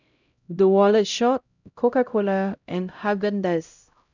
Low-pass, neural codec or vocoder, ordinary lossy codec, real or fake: 7.2 kHz; codec, 16 kHz, 0.5 kbps, X-Codec, HuBERT features, trained on LibriSpeech; none; fake